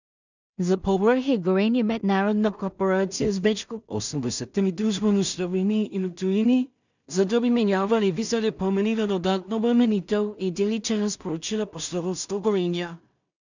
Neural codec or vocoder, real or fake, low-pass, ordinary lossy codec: codec, 16 kHz in and 24 kHz out, 0.4 kbps, LongCat-Audio-Codec, two codebook decoder; fake; 7.2 kHz; none